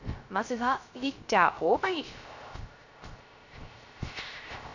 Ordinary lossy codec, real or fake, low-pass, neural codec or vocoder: none; fake; 7.2 kHz; codec, 16 kHz, 0.3 kbps, FocalCodec